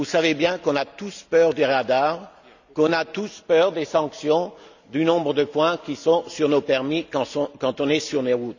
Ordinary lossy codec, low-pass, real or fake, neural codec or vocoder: none; 7.2 kHz; real; none